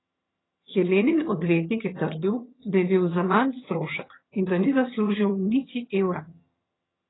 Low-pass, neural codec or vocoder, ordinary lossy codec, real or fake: 7.2 kHz; vocoder, 22.05 kHz, 80 mel bands, HiFi-GAN; AAC, 16 kbps; fake